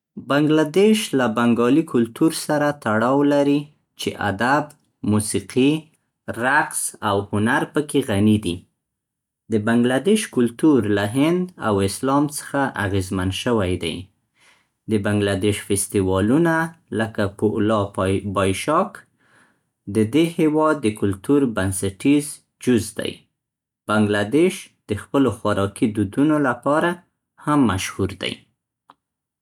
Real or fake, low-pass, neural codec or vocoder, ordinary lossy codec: real; 19.8 kHz; none; none